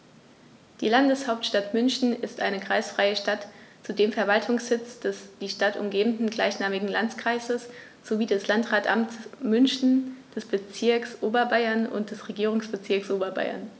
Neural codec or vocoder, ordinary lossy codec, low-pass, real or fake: none; none; none; real